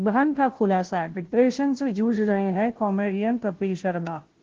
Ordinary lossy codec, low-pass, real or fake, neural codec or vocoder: Opus, 16 kbps; 7.2 kHz; fake; codec, 16 kHz, 0.5 kbps, FunCodec, trained on Chinese and English, 25 frames a second